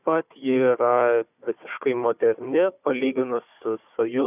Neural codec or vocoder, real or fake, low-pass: codec, 16 kHz, 4 kbps, FunCodec, trained on Chinese and English, 50 frames a second; fake; 3.6 kHz